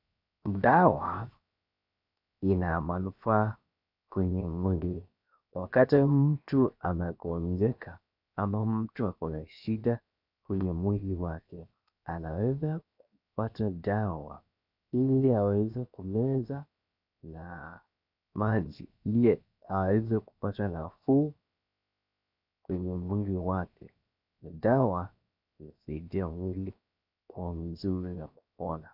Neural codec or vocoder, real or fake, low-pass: codec, 16 kHz, 0.7 kbps, FocalCodec; fake; 5.4 kHz